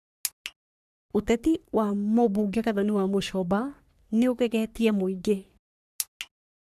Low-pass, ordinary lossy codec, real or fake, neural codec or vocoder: 14.4 kHz; none; fake; codec, 44.1 kHz, 3.4 kbps, Pupu-Codec